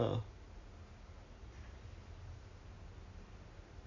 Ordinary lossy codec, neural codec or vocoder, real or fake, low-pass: MP3, 64 kbps; none; real; 7.2 kHz